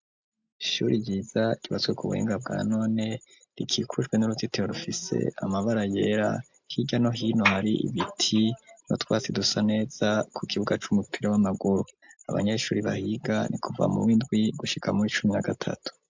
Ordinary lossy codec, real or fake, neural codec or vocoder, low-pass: MP3, 64 kbps; real; none; 7.2 kHz